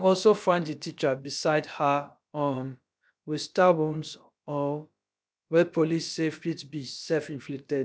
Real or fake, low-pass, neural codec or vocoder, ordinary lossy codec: fake; none; codec, 16 kHz, about 1 kbps, DyCAST, with the encoder's durations; none